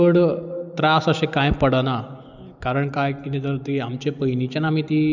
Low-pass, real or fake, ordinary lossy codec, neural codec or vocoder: 7.2 kHz; real; none; none